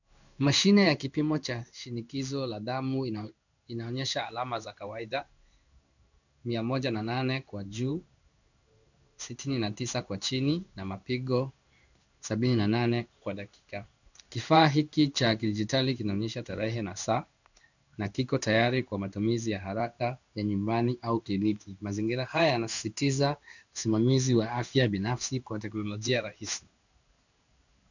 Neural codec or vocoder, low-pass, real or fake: codec, 16 kHz in and 24 kHz out, 1 kbps, XY-Tokenizer; 7.2 kHz; fake